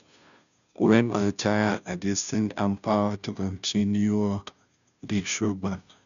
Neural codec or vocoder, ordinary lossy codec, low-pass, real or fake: codec, 16 kHz, 0.5 kbps, FunCodec, trained on Chinese and English, 25 frames a second; none; 7.2 kHz; fake